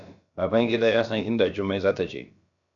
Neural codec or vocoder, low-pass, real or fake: codec, 16 kHz, about 1 kbps, DyCAST, with the encoder's durations; 7.2 kHz; fake